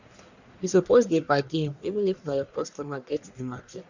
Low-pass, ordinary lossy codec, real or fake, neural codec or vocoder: 7.2 kHz; none; fake; codec, 44.1 kHz, 1.7 kbps, Pupu-Codec